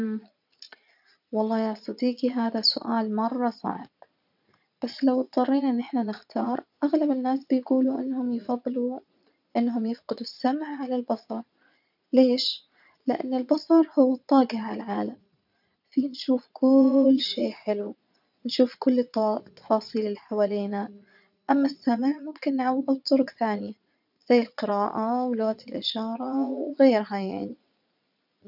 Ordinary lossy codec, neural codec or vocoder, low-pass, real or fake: none; vocoder, 22.05 kHz, 80 mel bands, Vocos; 5.4 kHz; fake